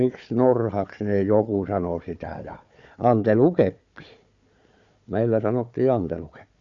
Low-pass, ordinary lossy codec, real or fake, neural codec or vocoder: 7.2 kHz; Opus, 64 kbps; fake; codec, 16 kHz, 4 kbps, FunCodec, trained on Chinese and English, 50 frames a second